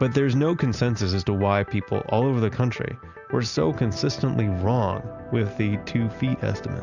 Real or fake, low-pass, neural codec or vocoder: real; 7.2 kHz; none